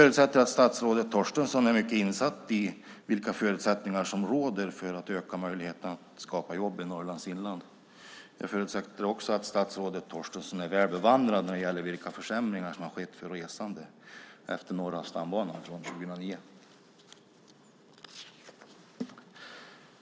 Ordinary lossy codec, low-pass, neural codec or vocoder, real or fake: none; none; none; real